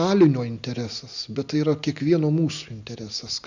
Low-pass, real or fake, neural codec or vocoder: 7.2 kHz; real; none